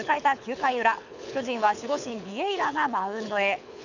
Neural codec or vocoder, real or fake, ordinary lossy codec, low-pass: codec, 24 kHz, 6 kbps, HILCodec; fake; none; 7.2 kHz